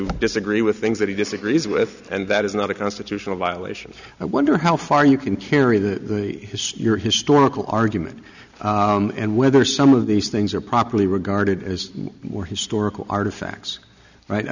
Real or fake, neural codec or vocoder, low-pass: real; none; 7.2 kHz